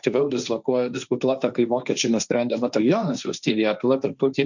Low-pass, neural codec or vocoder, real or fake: 7.2 kHz; codec, 16 kHz, 1.1 kbps, Voila-Tokenizer; fake